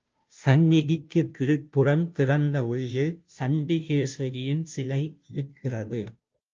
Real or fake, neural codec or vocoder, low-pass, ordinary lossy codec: fake; codec, 16 kHz, 0.5 kbps, FunCodec, trained on Chinese and English, 25 frames a second; 7.2 kHz; Opus, 24 kbps